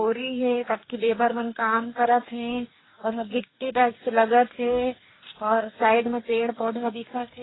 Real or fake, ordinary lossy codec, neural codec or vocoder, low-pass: fake; AAC, 16 kbps; codec, 44.1 kHz, 2.6 kbps, DAC; 7.2 kHz